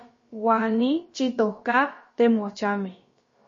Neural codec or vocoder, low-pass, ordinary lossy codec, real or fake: codec, 16 kHz, about 1 kbps, DyCAST, with the encoder's durations; 7.2 kHz; MP3, 32 kbps; fake